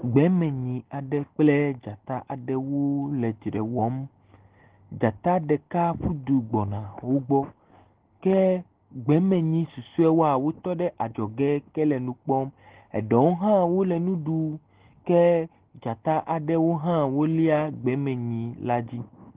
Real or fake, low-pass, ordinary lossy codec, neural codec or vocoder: real; 3.6 kHz; Opus, 16 kbps; none